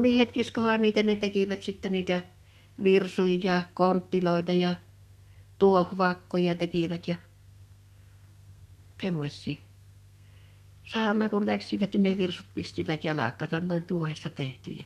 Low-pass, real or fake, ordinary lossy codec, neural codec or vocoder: 14.4 kHz; fake; none; codec, 32 kHz, 1.9 kbps, SNAC